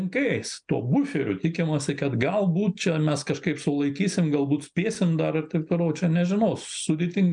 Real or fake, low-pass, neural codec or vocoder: real; 9.9 kHz; none